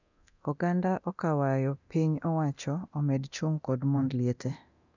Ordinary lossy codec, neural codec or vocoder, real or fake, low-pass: none; codec, 24 kHz, 0.9 kbps, DualCodec; fake; 7.2 kHz